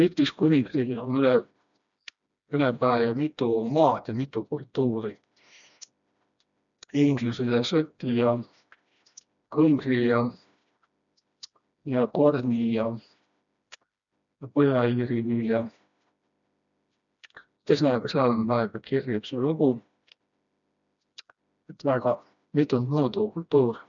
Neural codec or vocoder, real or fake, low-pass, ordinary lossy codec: codec, 16 kHz, 1 kbps, FreqCodec, smaller model; fake; 7.2 kHz; none